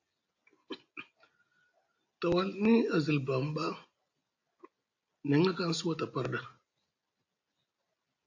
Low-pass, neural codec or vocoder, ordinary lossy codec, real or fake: 7.2 kHz; none; AAC, 48 kbps; real